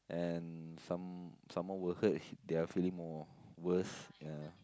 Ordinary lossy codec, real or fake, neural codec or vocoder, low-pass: none; real; none; none